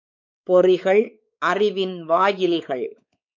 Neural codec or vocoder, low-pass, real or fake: codec, 16 kHz, 4 kbps, X-Codec, WavLM features, trained on Multilingual LibriSpeech; 7.2 kHz; fake